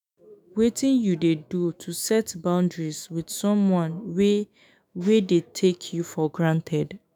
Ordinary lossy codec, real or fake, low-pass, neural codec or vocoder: none; fake; none; autoencoder, 48 kHz, 128 numbers a frame, DAC-VAE, trained on Japanese speech